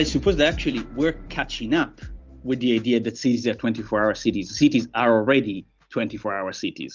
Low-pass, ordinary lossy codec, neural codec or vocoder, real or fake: 7.2 kHz; Opus, 32 kbps; none; real